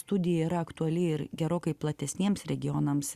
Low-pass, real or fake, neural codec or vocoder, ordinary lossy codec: 14.4 kHz; real; none; Opus, 64 kbps